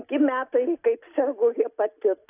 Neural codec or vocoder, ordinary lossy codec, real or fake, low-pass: none; AAC, 24 kbps; real; 3.6 kHz